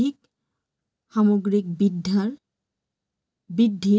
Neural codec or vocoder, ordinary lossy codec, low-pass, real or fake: none; none; none; real